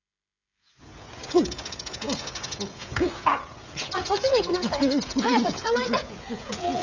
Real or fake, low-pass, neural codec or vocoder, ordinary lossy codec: fake; 7.2 kHz; codec, 16 kHz, 16 kbps, FreqCodec, smaller model; none